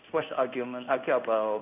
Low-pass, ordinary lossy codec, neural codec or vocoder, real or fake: 3.6 kHz; none; codec, 16 kHz in and 24 kHz out, 1 kbps, XY-Tokenizer; fake